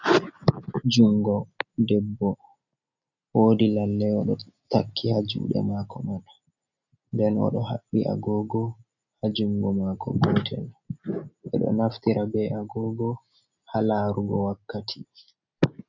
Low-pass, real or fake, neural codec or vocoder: 7.2 kHz; real; none